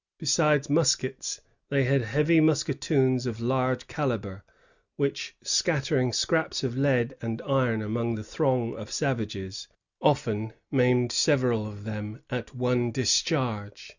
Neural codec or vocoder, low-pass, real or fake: none; 7.2 kHz; real